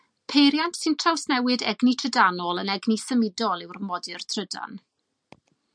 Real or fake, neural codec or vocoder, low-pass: real; none; 9.9 kHz